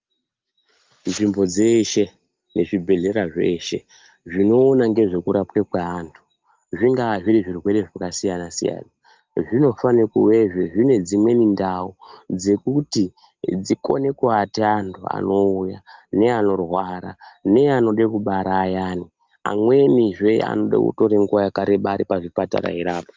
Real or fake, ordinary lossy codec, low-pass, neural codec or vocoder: real; Opus, 32 kbps; 7.2 kHz; none